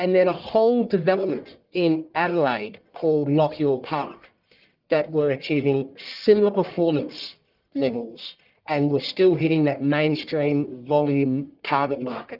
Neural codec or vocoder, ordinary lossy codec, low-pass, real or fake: codec, 44.1 kHz, 1.7 kbps, Pupu-Codec; Opus, 32 kbps; 5.4 kHz; fake